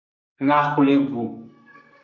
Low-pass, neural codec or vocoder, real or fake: 7.2 kHz; codec, 44.1 kHz, 2.6 kbps, SNAC; fake